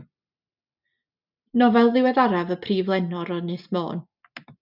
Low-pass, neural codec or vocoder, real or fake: 5.4 kHz; none; real